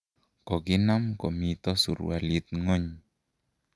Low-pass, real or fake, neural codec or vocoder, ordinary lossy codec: none; real; none; none